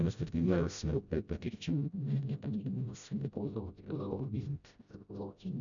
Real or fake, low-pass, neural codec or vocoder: fake; 7.2 kHz; codec, 16 kHz, 0.5 kbps, FreqCodec, smaller model